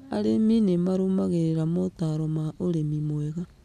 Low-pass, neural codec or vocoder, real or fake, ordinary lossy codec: 14.4 kHz; none; real; none